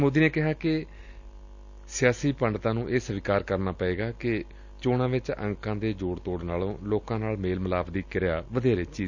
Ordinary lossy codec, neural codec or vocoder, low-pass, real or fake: Opus, 64 kbps; none; 7.2 kHz; real